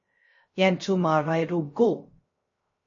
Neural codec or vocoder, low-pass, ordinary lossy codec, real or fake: codec, 16 kHz, 0.3 kbps, FocalCodec; 7.2 kHz; MP3, 32 kbps; fake